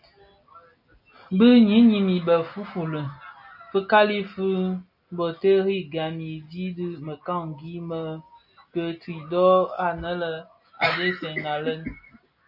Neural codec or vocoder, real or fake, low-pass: none; real; 5.4 kHz